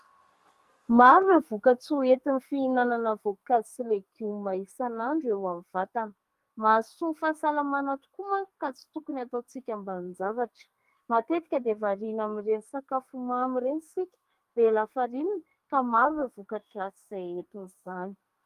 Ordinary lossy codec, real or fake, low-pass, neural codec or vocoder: Opus, 32 kbps; fake; 14.4 kHz; codec, 44.1 kHz, 2.6 kbps, SNAC